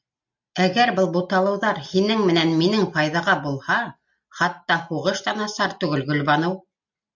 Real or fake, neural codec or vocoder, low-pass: real; none; 7.2 kHz